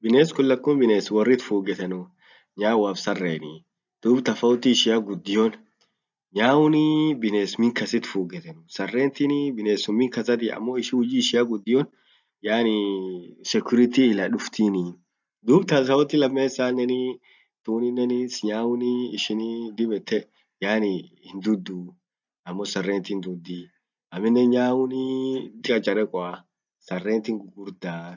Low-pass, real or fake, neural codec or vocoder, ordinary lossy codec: 7.2 kHz; real; none; none